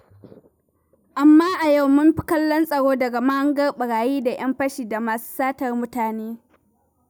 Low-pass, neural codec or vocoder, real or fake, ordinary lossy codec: none; none; real; none